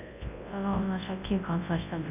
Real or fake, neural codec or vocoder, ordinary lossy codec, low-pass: fake; codec, 24 kHz, 0.9 kbps, WavTokenizer, large speech release; none; 3.6 kHz